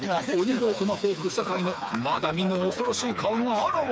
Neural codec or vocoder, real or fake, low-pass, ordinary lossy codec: codec, 16 kHz, 4 kbps, FreqCodec, smaller model; fake; none; none